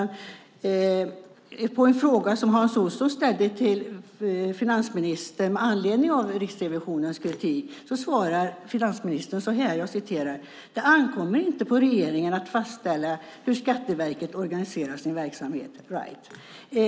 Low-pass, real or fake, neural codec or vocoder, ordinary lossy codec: none; real; none; none